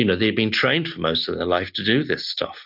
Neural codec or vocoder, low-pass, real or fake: none; 5.4 kHz; real